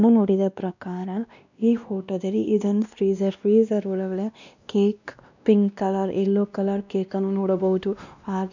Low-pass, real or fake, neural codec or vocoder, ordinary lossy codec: 7.2 kHz; fake; codec, 16 kHz, 1 kbps, X-Codec, WavLM features, trained on Multilingual LibriSpeech; none